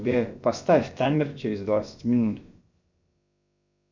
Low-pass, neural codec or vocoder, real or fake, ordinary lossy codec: 7.2 kHz; codec, 16 kHz, about 1 kbps, DyCAST, with the encoder's durations; fake; MP3, 64 kbps